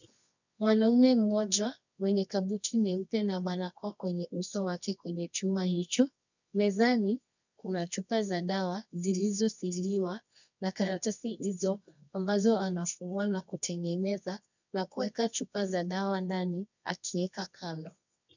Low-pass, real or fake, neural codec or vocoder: 7.2 kHz; fake; codec, 24 kHz, 0.9 kbps, WavTokenizer, medium music audio release